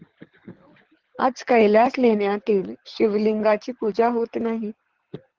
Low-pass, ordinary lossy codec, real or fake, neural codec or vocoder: 7.2 kHz; Opus, 16 kbps; fake; codec, 24 kHz, 6 kbps, HILCodec